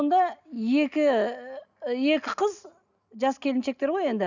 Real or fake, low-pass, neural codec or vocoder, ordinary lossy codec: real; 7.2 kHz; none; none